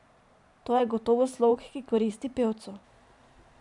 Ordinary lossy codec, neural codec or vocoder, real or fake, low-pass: none; vocoder, 44.1 kHz, 128 mel bands every 512 samples, BigVGAN v2; fake; 10.8 kHz